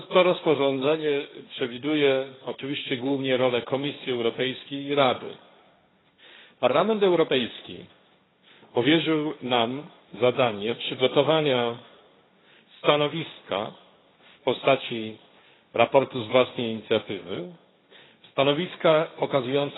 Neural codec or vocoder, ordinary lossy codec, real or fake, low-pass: codec, 16 kHz, 1.1 kbps, Voila-Tokenizer; AAC, 16 kbps; fake; 7.2 kHz